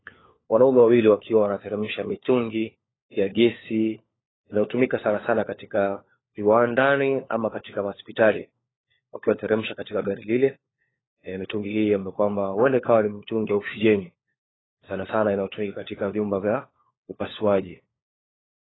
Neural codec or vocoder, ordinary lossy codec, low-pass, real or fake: codec, 16 kHz, 4 kbps, FunCodec, trained on LibriTTS, 50 frames a second; AAC, 16 kbps; 7.2 kHz; fake